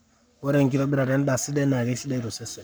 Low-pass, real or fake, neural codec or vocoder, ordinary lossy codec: none; fake; codec, 44.1 kHz, 7.8 kbps, Pupu-Codec; none